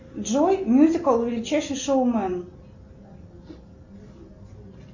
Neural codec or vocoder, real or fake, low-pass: none; real; 7.2 kHz